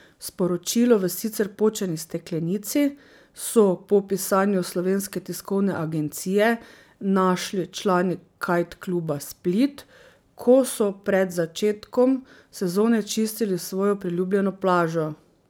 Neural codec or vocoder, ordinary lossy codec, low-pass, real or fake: none; none; none; real